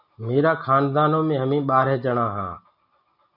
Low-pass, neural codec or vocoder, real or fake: 5.4 kHz; none; real